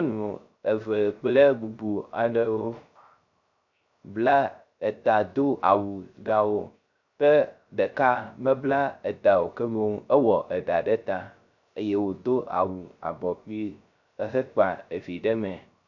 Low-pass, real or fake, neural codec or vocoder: 7.2 kHz; fake; codec, 16 kHz, 0.3 kbps, FocalCodec